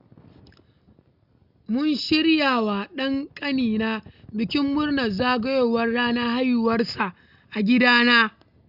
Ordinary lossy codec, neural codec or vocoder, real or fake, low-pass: none; none; real; 5.4 kHz